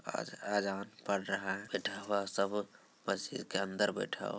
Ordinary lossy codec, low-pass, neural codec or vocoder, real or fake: none; none; none; real